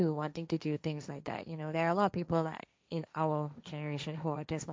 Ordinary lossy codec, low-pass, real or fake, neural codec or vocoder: none; none; fake; codec, 16 kHz, 1.1 kbps, Voila-Tokenizer